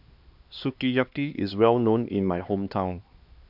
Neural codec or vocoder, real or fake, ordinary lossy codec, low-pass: codec, 16 kHz, 2 kbps, X-Codec, HuBERT features, trained on LibriSpeech; fake; none; 5.4 kHz